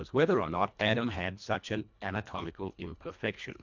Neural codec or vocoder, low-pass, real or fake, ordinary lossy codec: codec, 24 kHz, 1.5 kbps, HILCodec; 7.2 kHz; fake; MP3, 64 kbps